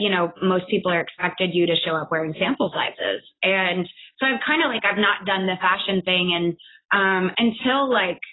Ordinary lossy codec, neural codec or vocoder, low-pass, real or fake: AAC, 16 kbps; none; 7.2 kHz; real